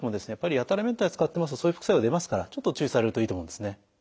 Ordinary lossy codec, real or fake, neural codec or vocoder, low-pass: none; real; none; none